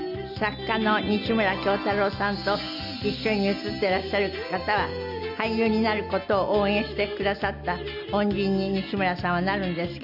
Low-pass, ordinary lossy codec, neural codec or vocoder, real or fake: 5.4 kHz; none; none; real